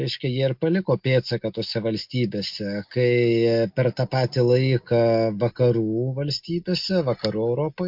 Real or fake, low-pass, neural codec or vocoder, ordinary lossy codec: real; 5.4 kHz; none; MP3, 48 kbps